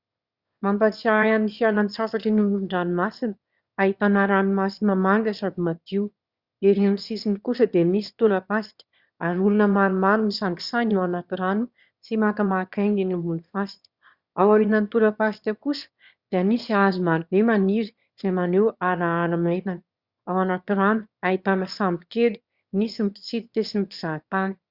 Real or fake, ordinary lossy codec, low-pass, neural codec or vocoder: fake; Opus, 64 kbps; 5.4 kHz; autoencoder, 22.05 kHz, a latent of 192 numbers a frame, VITS, trained on one speaker